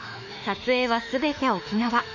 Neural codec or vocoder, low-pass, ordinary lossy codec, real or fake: autoencoder, 48 kHz, 32 numbers a frame, DAC-VAE, trained on Japanese speech; 7.2 kHz; none; fake